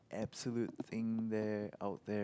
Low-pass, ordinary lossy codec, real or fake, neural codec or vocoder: none; none; real; none